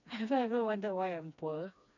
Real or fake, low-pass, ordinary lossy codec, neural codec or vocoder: fake; 7.2 kHz; none; codec, 16 kHz, 2 kbps, FreqCodec, smaller model